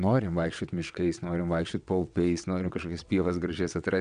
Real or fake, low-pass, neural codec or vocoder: fake; 9.9 kHz; vocoder, 22.05 kHz, 80 mel bands, WaveNeXt